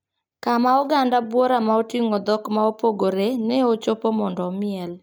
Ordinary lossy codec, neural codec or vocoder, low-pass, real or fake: none; none; none; real